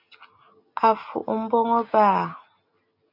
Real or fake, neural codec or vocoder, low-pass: real; none; 5.4 kHz